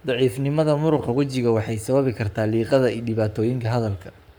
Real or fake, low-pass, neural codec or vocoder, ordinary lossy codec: fake; none; codec, 44.1 kHz, 7.8 kbps, Pupu-Codec; none